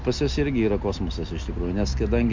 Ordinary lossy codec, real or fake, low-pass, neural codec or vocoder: MP3, 64 kbps; real; 7.2 kHz; none